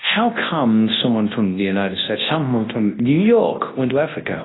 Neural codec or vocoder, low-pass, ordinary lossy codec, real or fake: codec, 24 kHz, 0.9 kbps, WavTokenizer, large speech release; 7.2 kHz; AAC, 16 kbps; fake